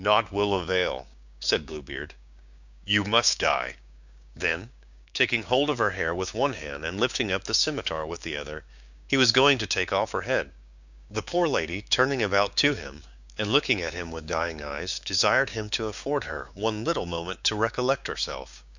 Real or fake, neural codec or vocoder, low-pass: fake; codec, 16 kHz, 6 kbps, DAC; 7.2 kHz